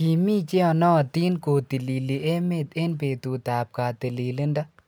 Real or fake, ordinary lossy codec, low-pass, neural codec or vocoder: real; none; none; none